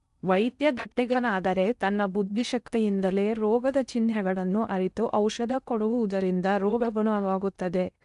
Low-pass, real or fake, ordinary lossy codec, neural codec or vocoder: 10.8 kHz; fake; none; codec, 16 kHz in and 24 kHz out, 0.6 kbps, FocalCodec, streaming, 4096 codes